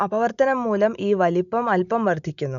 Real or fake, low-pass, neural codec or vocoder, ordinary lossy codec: real; 7.2 kHz; none; none